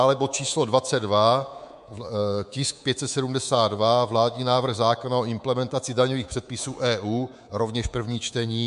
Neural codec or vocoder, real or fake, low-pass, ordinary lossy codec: codec, 24 kHz, 3.1 kbps, DualCodec; fake; 10.8 kHz; MP3, 64 kbps